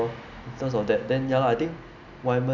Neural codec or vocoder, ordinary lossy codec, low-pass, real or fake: none; none; 7.2 kHz; real